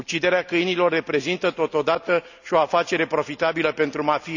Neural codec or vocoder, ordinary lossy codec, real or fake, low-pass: none; none; real; 7.2 kHz